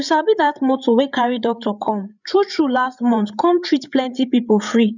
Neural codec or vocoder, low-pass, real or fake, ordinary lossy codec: codec, 16 kHz, 8 kbps, FreqCodec, larger model; 7.2 kHz; fake; none